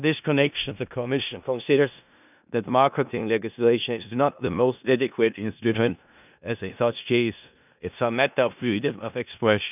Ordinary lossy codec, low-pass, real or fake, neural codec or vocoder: none; 3.6 kHz; fake; codec, 16 kHz in and 24 kHz out, 0.4 kbps, LongCat-Audio-Codec, four codebook decoder